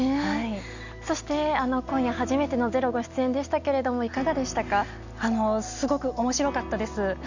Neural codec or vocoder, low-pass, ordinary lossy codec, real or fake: none; 7.2 kHz; none; real